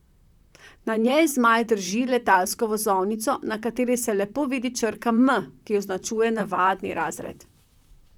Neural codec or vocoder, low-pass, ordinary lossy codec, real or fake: vocoder, 44.1 kHz, 128 mel bands, Pupu-Vocoder; 19.8 kHz; none; fake